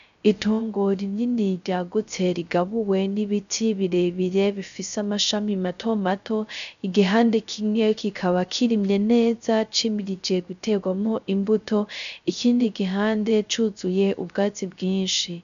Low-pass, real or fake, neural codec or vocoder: 7.2 kHz; fake; codec, 16 kHz, 0.3 kbps, FocalCodec